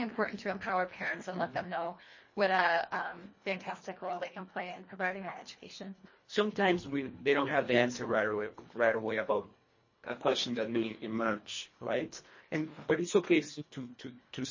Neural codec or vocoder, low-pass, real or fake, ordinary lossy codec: codec, 24 kHz, 1.5 kbps, HILCodec; 7.2 kHz; fake; MP3, 32 kbps